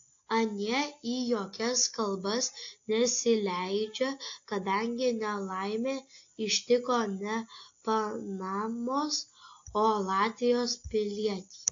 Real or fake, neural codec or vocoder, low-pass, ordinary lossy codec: real; none; 7.2 kHz; AAC, 48 kbps